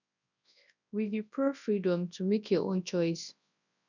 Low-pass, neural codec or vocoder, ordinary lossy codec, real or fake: 7.2 kHz; codec, 24 kHz, 0.9 kbps, WavTokenizer, large speech release; none; fake